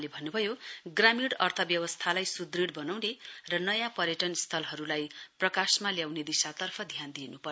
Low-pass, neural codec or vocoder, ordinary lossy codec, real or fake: none; none; none; real